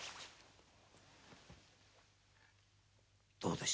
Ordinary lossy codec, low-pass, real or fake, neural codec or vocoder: none; none; real; none